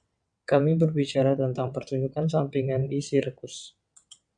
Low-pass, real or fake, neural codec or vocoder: 9.9 kHz; fake; vocoder, 22.05 kHz, 80 mel bands, WaveNeXt